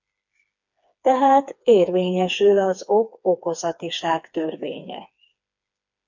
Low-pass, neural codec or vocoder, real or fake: 7.2 kHz; codec, 16 kHz, 4 kbps, FreqCodec, smaller model; fake